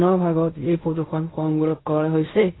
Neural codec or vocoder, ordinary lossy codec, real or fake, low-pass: codec, 16 kHz in and 24 kHz out, 0.4 kbps, LongCat-Audio-Codec, fine tuned four codebook decoder; AAC, 16 kbps; fake; 7.2 kHz